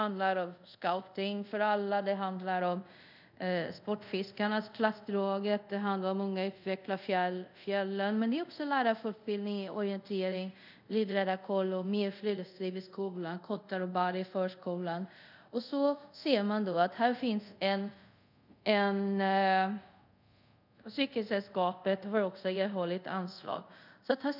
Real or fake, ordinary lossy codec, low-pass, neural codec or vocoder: fake; none; 5.4 kHz; codec, 24 kHz, 0.5 kbps, DualCodec